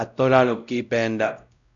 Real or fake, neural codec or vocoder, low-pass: fake; codec, 16 kHz, 0.5 kbps, X-Codec, WavLM features, trained on Multilingual LibriSpeech; 7.2 kHz